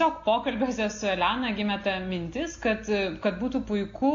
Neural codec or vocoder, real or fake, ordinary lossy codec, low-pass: none; real; AAC, 48 kbps; 7.2 kHz